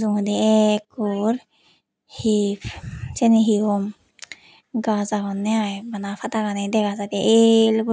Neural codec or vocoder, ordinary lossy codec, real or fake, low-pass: none; none; real; none